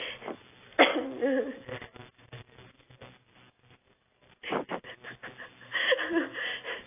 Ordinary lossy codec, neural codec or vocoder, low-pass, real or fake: AAC, 24 kbps; none; 3.6 kHz; real